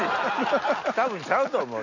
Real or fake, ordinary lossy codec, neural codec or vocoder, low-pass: real; none; none; 7.2 kHz